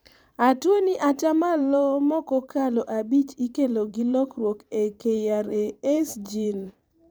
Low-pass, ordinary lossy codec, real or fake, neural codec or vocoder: none; none; fake; vocoder, 44.1 kHz, 128 mel bands every 512 samples, BigVGAN v2